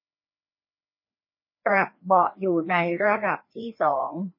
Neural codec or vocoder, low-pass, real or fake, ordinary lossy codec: codec, 16 kHz, 2 kbps, FreqCodec, larger model; 5.4 kHz; fake; MP3, 24 kbps